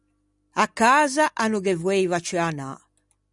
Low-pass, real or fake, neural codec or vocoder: 10.8 kHz; real; none